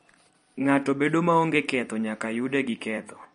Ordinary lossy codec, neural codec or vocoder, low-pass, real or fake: MP3, 48 kbps; vocoder, 44.1 kHz, 128 mel bands every 256 samples, BigVGAN v2; 19.8 kHz; fake